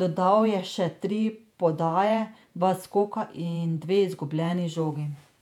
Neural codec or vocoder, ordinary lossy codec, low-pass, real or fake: vocoder, 48 kHz, 128 mel bands, Vocos; none; 19.8 kHz; fake